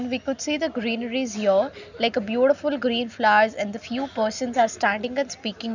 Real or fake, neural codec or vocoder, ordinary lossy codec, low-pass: real; none; none; 7.2 kHz